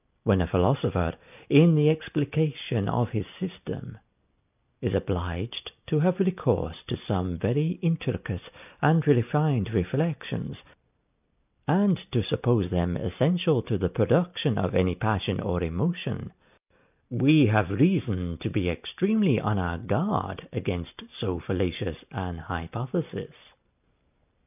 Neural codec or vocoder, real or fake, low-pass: none; real; 3.6 kHz